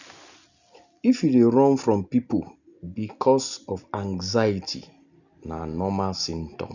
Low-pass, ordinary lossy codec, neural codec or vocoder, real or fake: 7.2 kHz; none; none; real